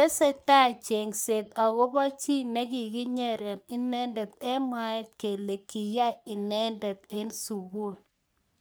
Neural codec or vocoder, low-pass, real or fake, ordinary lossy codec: codec, 44.1 kHz, 3.4 kbps, Pupu-Codec; none; fake; none